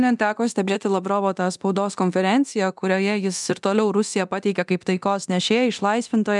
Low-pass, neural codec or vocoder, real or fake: 10.8 kHz; codec, 24 kHz, 0.9 kbps, DualCodec; fake